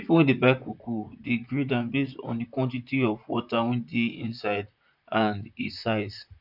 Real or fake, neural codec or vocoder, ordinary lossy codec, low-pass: fake; vocoder, 44.1 kHz, 128 mel bands, Pupu-Vocoder; none; 5.4 kHz